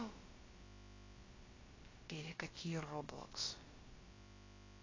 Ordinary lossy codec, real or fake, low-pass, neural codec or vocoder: AAC, 32 kbps; fake; 7.2 kHz; codec, 16 kHz, about 1 kbps, DyCAST, with the encoder's durations